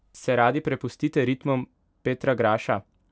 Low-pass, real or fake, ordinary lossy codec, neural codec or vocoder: none; real; none; none